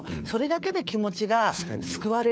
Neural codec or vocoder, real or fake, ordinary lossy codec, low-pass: codec, 16 kHz, 4 kbps, FunCodec, trained on LibriTTS, 50 frames a second; fake; none; none